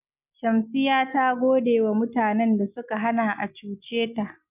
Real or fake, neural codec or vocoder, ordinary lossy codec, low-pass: real; none; none; 3.6 kHz